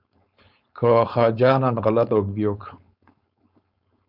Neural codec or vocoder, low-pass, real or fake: codec, 16 kHz, 4.8 kbps, FACodec; 5.4 kHz; fake